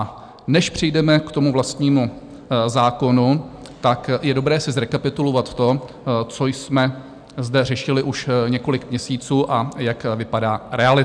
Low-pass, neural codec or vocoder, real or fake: 9.9 kHz; none; real